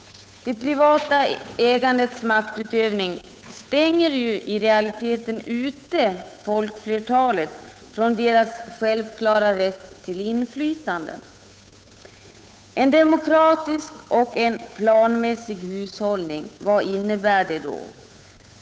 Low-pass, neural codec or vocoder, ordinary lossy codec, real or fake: none; codec, 16 kHz, 8 kbps, FunCodec, trained on Chinese and English, 25 frames a second; none; fake